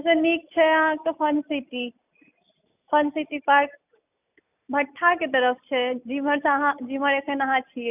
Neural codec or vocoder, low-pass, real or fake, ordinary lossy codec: none; 3.6 kHz; real; none